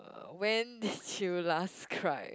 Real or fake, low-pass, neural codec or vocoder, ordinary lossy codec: real; none; none; none